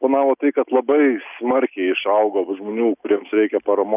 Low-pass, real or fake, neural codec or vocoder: 3.6 kHz; real; none